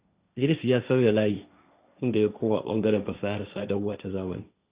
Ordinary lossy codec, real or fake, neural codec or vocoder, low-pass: Opus, 64 kbps; fake; codec, 16 kHz, 1.1 kbps, Voila-Tokenizer; 3.6 kHz